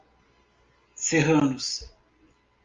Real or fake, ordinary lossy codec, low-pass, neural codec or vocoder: real; Opus, 32 kbps; 7.2 kHz; none